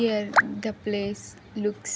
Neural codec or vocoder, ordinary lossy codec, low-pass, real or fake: none; none; none; real